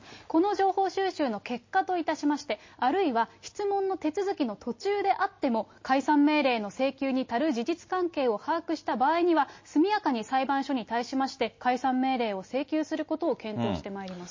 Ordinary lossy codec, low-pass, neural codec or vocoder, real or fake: MP3, 32 kbps; 7.2 kHz; none; real